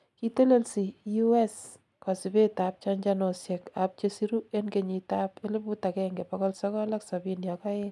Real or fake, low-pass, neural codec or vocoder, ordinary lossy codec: real; none; none; none